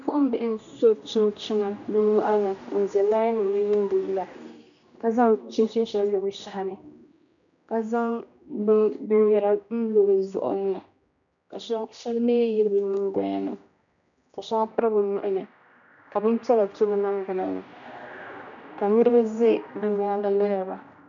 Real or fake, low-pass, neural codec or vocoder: fake; 7.2 kHz; codec, 16 kHz, 1 kbps, X-Codec, HuBERT features, trained on general audio